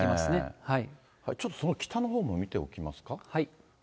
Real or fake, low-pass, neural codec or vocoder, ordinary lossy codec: real; none; none; none